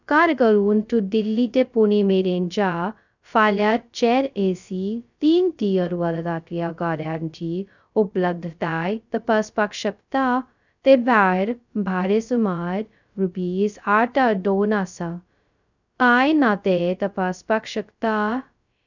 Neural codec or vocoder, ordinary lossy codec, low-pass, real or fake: codec, 16 kHz, 0.2 kbps, FocalCodec; none; 7.2 kHz; fake